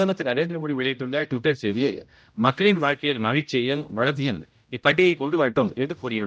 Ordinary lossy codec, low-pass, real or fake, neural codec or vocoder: none; none; fake; codec, 16 kHz, 0.5 kbps, X-Codec, HuBERT features, trained on general audio